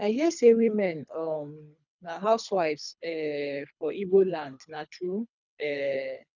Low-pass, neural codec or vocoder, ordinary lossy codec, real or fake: 7.2 kHz; codec, 24 kHz, 3 kbps, HILCodec; none; fake